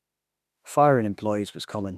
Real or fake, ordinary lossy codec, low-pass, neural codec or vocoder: fake; none; 14.4 kHz; autoencoder, 48 kHz, 32 numbers a frame, DAC-VAE, trained on Japanese speech